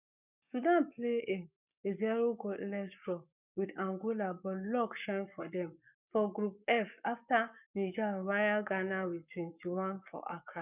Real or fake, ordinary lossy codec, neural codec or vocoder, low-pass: fake; none; codec, 44.1 kHz, 7.8 kbps, Pupu-Codec; 3.6 kHz